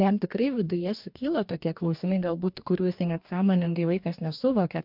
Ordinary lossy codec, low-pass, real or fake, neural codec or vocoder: MP3, 48 kbps; 5.4 kHz; fake; codec, 44.1 kHz, 2.6 kbps, DAC